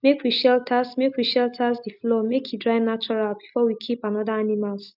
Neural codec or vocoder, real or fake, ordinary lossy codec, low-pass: none; real; none; 5.4 kHz